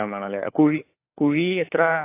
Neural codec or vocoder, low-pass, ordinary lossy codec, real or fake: codec, 16 kHz, 4 kbps, FunCodec, trained on LibriTTS, 50 frames a second; 3.6 kHz; AAC, 24 kbps; fake